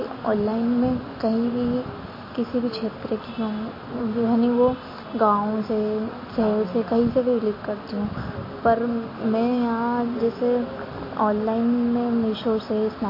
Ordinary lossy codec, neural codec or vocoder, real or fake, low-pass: MP3, 24 kbps; none; real; 5.4 kHz